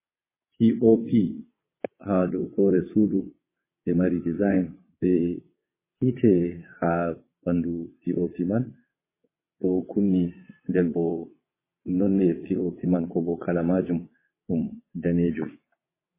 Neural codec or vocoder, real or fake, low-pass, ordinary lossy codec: vocoder, 22.05 kHz, 80 mel bands, WaveNeXt; fake; 3.6 kHz; MP3, 16 kbps